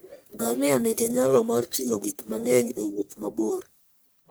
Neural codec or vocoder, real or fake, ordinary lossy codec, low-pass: codec, 44.1 kHz, 1.7 kbps, Pupu-Codec; fake; none; none